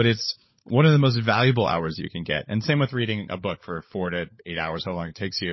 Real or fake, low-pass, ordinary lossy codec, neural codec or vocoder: fake; 7.2 kHz; MP3, 24 kbps; codec, 16 kHz, 16 kbps, FunCodec, trained on LibriTTS, 50 frames a second